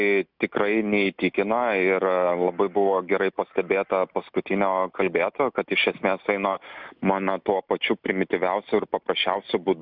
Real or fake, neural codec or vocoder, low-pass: real; none; 5.4 kHz